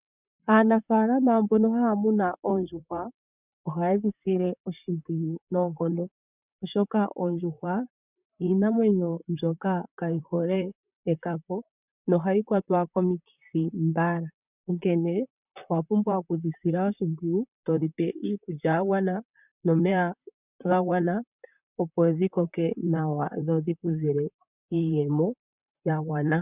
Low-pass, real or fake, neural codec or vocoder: 3.6 kHz; fake; vocoder, 44.1 kHz, 128 mel bands, Pupu-Vocoder